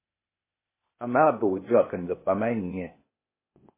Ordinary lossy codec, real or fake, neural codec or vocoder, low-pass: MP3, 16 kbps; fake; codec, 16 kHz, 0.8 kbps, ZipCodec; 3.6 kHz